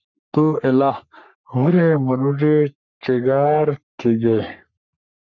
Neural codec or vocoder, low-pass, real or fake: codec, 44.1 kHz, 3.4 kbps, Pupu-Codec; 7.2 kHz; fake